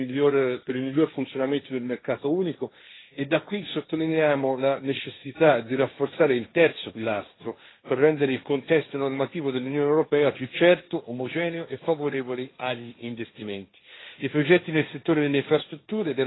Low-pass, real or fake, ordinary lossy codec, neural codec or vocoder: 7.2 kHz; fake; AAC, 16 kbps; codec, 16 kHz, 1.1 kbps, Voila-Tokenizer